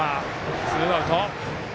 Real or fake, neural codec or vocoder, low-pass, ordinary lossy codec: real; none; none; none